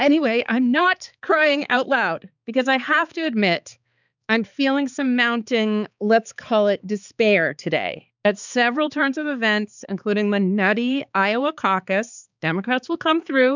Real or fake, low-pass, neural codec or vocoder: fake; 7.2 kHz; codec, 16 kHz, 4 kbps, X-Codec, HuBERT features, trained on balanced general audio